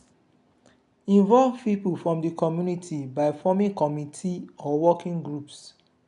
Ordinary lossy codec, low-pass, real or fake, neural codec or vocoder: none; 10.8 kHz; real; none